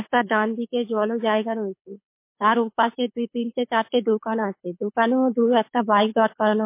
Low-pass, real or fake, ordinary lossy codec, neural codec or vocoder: 3.6 kHz; fake; MP3, 24 kbps; codec, 24 kHz, 6 kbps, HILCodec